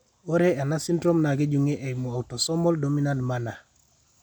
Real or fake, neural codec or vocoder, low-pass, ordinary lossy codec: real; none; 19.8 kHz; none